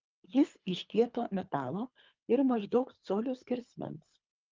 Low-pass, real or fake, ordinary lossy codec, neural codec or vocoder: 7.2 kHz; fake; Opus, 24 kbps; codec, 24 kHz, 3 kbps, HILCodec